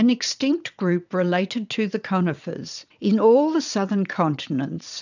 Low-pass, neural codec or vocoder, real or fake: 7.2 kHz; vocoder, 22.05 kHz, 80 mel bands, WaveNeXt; fake